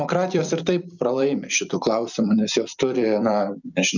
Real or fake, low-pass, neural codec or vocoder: fake; 7.2 kHz; vocoder, 44.1 kHz, 128 mel bands every 256 samples, BigVGAN v2